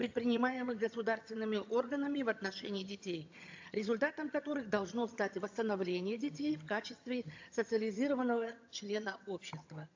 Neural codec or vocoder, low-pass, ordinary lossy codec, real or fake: vocoder, 22.05 kHz, 80 mel bands, HiFi-GAN; 7.2 kHz; AAC, 48 kbps; fake